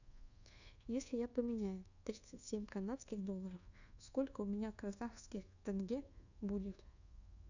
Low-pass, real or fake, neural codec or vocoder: 7.2 kHz; fake; codec, 24 kHz, 1.2 kbps, DualCodec